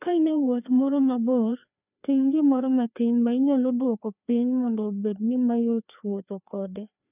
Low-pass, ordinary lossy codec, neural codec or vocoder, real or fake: 3.6 kHz; none; codec, 16 kHz, 2 kbps, FreqCodec, larger model; fake